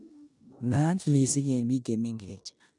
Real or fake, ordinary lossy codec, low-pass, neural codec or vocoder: fake; none; 10.8 kHz; codec, 16 kHz in and 24 kHz out, 0.9 kbps, LongCat-Audio-Codec, four codebook decoder